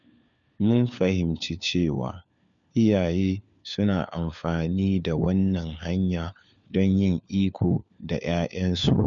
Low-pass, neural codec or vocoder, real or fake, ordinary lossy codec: 7.2 kHz; codec, 16 kHz, 4 kbps, FunCodec, trained on LibriTTS, 50 frames a second; fake; none